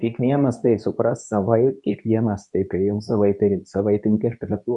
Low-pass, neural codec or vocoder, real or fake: 10.8 kHz; codec, 24 kHz, 0.9 kbps, WavTokenizer, medium speech release version 2; fake